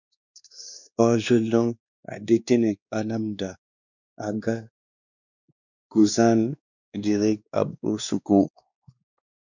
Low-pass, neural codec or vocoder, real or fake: 7.2 kHz; codec, 16 kHz, 2 kbps, X-Codec, WavLM features, trained on Multilingual LibriSpeech; fake